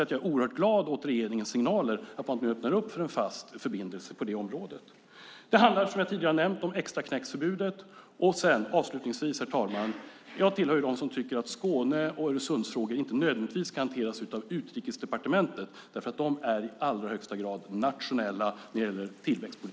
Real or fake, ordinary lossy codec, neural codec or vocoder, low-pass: real; none; none; none